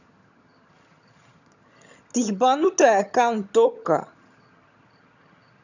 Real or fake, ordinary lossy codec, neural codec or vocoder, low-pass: fake; none; vocoder, 22.05 kHz, 80 mel bands, HiFi-GAN; 7.2 kHz